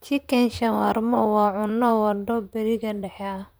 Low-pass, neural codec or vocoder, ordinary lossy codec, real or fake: none; vocoder, 44.1 kHz, 128 mel bands, Pupu-Vocoder; none; fake